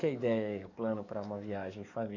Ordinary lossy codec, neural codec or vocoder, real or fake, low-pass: none; codec, 44.1 kHz, 7.8 kbps, DAC; fake; 7.2 kHz